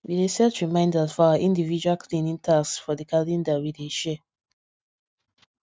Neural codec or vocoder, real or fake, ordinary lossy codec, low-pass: none; real; none; none